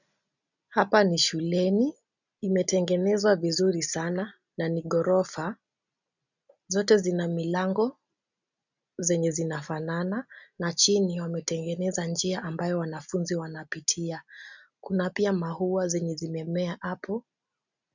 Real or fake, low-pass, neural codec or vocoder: real; 7.2 kHz; none